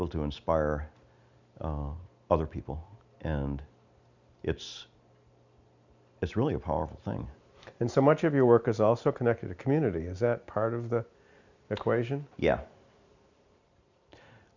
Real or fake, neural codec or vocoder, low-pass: real; none; 7.2 kHz